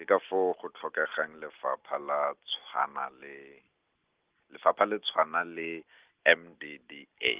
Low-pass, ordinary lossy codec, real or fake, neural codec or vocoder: 3.6 kHz; Opus, 16 kbps; real; none